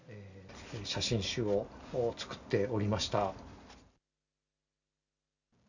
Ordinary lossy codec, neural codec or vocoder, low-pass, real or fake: none; none; 7.2 kHz; real